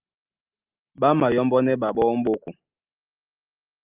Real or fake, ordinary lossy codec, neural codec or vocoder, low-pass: real; Opus, 32 kbps; none; 3.6 kHz